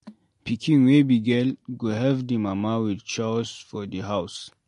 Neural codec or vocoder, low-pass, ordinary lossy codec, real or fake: none; 14.4 kHz; MP3, 48 kbps; real